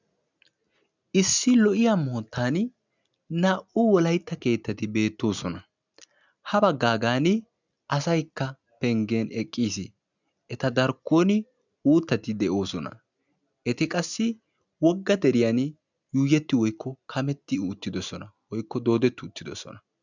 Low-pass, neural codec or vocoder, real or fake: 7.2 kHz; none; real